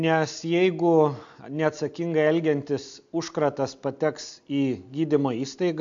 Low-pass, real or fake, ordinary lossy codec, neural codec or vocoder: 7.2 kHz; real; AAC, 64 kbps; none